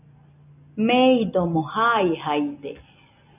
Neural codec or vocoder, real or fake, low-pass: none; real; 3.6 kHz